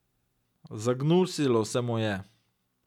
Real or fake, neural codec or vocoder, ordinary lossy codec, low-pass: real; none; none; 19.8 kHz